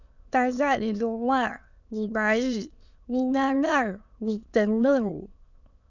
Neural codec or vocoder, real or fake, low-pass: autoencoder, 22.05 kHz, a latent of 192 numbers a frame, VITS, trained on many speakers; fake; 7.2 kHz